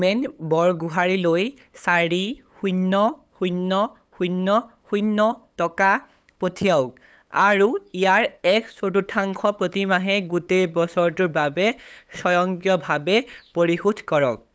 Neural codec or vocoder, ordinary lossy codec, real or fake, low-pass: codec, 16 kHz, 8 kbps, FunCodec, trained on LibriTTS, 25 frames a second; none; fake; none